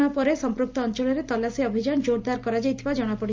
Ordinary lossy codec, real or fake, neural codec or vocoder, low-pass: Opus, 24 kbps; real; none; 7.2 kHz